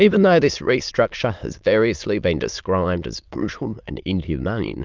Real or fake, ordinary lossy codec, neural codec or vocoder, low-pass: fake; Opus, 24 kbps; autoencoder, 22.05 kHz, a latent of 192 numbers a frame, VITS, trained on many speakers; 7.2 kHz